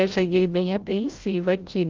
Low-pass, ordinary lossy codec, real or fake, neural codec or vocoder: 7.2 kHz; Opus, 24 kbps; fake; codec, 16 kHz, 0.5 kbps, FreqCodec, larger model